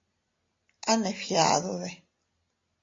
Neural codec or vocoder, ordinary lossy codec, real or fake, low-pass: none; AAC, 32 kbps; real; 7.2 kHz